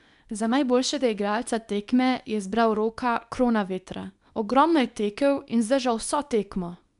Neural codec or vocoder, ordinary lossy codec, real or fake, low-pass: codec, 24 kHz, 0.9 kbps, WavTokenizer, small release; none; fake; 10.8 kHz